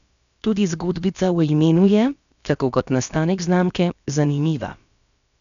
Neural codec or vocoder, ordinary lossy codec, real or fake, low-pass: codec, 16 kHz, about 1 kbps, DyCAST, with the encoder's durations; none; fake; 7.2 kHz